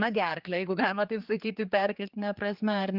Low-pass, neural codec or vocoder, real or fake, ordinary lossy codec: 5.4 kHz; codec, 16 kHz, 4 kbps, X-Codec, HuBERT features, trained on general audio; fake; Opus, 32 kbps